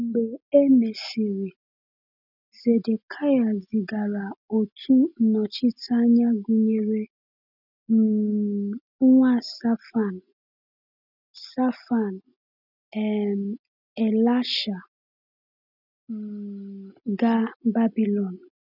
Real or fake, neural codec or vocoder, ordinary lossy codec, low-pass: real; none; none; 5.4 kHz